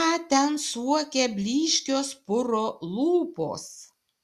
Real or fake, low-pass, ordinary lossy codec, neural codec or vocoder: real; 14.4 kHz; Opus, 64 kbps; none